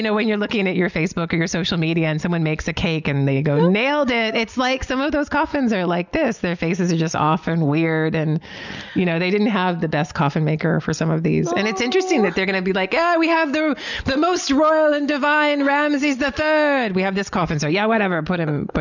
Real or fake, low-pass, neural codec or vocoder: real; 7.2 kHz; none